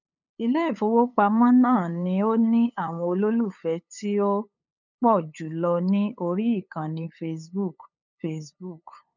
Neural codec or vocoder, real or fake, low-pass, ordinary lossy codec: codec, 16 kHz, 8 kbps, FunCodec, trained on LibriTTS, 25 frames a second; fake; 7.2 kHz; none